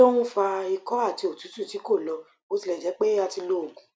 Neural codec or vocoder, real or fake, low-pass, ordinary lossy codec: none; real; none; none